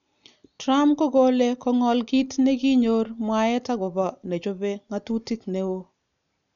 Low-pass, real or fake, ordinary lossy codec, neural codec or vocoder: 7.2 kHz; real; none; none